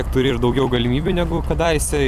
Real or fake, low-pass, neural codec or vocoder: fake; 14.4 kHz; vocoder, 44.1 kHz, 128 mel bands every 256 samples, BigVGAN v2